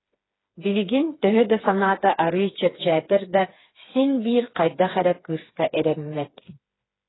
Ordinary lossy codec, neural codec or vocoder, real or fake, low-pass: AAC, 16 kbps; codec, 16 kHz, 4 kbps, FreqCodec, smaller model; fake; 7.2 kHz